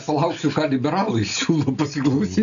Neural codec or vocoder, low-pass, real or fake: none; 7.2 kHz; real